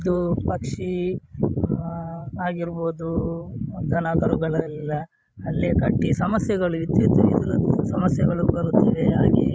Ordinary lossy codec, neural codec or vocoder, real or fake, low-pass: none; codec, 16 kHz, 16 kbps, FreqCodec, larger model; fake; none